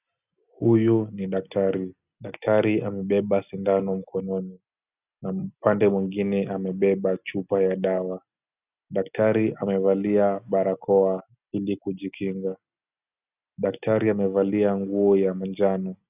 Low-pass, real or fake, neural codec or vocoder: 3.6 kHz; real; none